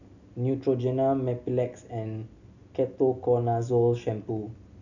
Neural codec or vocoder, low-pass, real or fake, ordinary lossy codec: none; 7.2 kHz; real; none